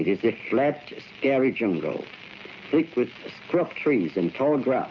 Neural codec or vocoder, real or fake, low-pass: none; real; 7.2 kHz